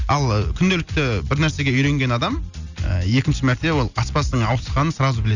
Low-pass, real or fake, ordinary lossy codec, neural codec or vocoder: 7.2 kHz; real; none; none